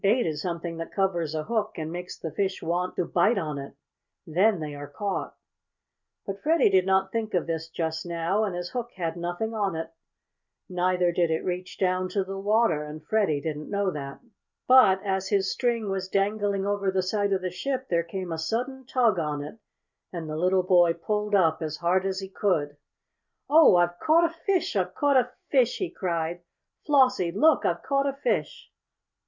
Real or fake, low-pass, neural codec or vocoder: real; 7.2 kHz; none